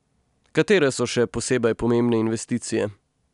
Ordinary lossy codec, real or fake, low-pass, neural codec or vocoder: none; real; 10.8 kHz; none